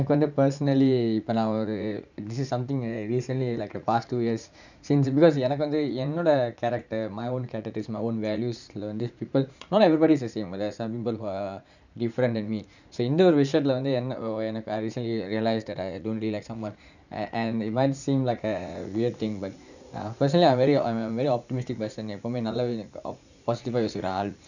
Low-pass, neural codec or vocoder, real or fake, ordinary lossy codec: 7.2 kHz; vocoder, 44.1 kHz, 80 mel bands, Vocos; fake; none